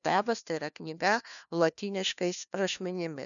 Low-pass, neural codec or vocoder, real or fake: 7.2 kHz; codec, 16 kHz, 1 kbps, FunCodec, trained on LibriTTS, 50 frames a second; fake